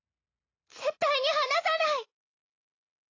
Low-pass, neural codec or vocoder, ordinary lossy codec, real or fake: 7.2 kHz; none; AAC, 32 kbps; real